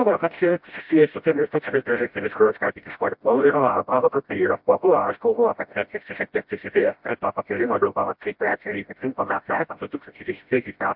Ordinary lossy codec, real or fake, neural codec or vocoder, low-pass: MP3, 48 kbps; fake; codec, 16 kHz, 0.5 kbps, FreqCodec, smaller model; 5.4 kHz